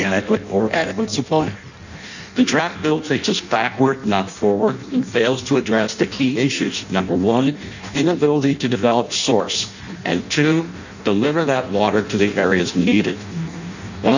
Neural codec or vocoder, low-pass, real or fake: codec, 16 kHz in and 24 kHz out, 0.6 kbps, FireRedTTS-2 codec; 7.2 kHz; fake